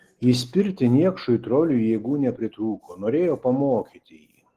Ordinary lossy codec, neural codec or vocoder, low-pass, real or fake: Opus, 16 kbps; none; 14.4 kHz; real